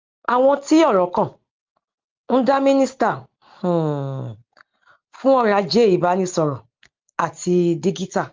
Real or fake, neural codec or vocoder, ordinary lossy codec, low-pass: real; none; Opus, 32 kbps; 7.2 kHz